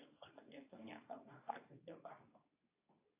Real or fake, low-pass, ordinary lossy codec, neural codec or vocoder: fake; 3.6 kHz; AAC, 24 kbps; codec, 24 kHz, 0.9 kbps, WavTokenizer, medium speech release version 2